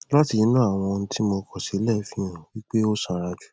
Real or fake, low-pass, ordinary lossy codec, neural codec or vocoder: real; none; none; none